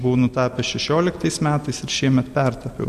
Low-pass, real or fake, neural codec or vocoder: 14.4 kHz; real; none